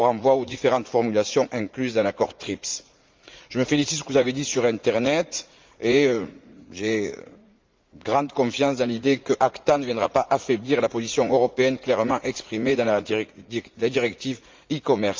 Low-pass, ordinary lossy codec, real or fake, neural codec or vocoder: 7.2 kHz; Opus, 24 kbps; fake; vocoder, 44.1 kHz, 80 mel bands, Vocos